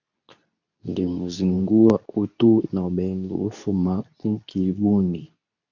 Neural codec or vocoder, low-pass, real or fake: codec, 24 kHz, 0.9 kbps, WavTokenizer, medium speech release version 2; 7.2 kHz; fake